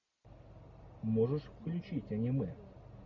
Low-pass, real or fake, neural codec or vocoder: 7.2 kHz; real; none